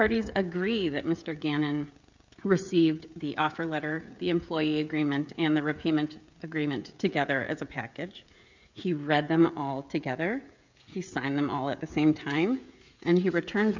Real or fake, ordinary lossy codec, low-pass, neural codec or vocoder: fake; MP3, 64 kbps; 7.2 kHz; codec, 16 kHz, 16 kbps, FreqCodec, smaller model